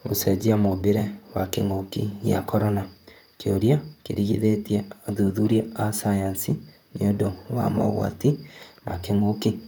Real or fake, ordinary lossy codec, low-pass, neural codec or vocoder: fake; none; none; vocoder, 44.1 kHz, 128 mel bands, Pupu-Vocoder